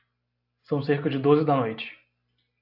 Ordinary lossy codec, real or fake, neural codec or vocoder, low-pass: AAC, 48 kbps; real; none; 5.4 kHz